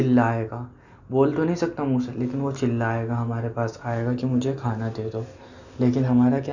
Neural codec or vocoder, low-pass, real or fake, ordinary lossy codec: none; 7.2 kHz; real; none